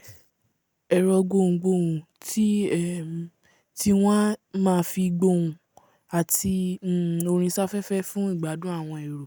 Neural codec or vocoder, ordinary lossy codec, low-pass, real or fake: none; none; none; real